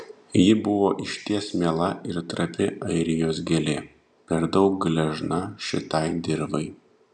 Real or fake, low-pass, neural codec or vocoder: real; 10.8 kHz; none